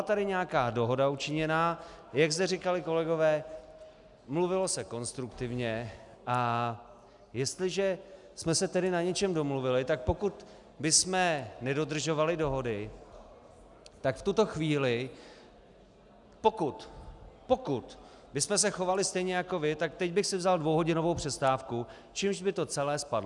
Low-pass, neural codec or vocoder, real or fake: 10.8 kHz; none; real